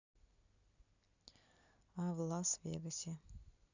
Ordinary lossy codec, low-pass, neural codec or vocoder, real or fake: none; 7.2 kHz; none; real